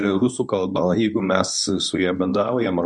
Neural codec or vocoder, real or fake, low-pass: codec, 24 kHz, 0.9 kbps, WavTokenizer, medium speech release version 2; fake; 10.8 kHz